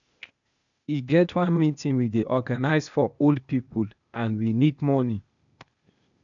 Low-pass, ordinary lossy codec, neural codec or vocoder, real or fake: 7.2 kHz; none; codec, 16 kHz, 0.8 kbps, ZipCodec; fake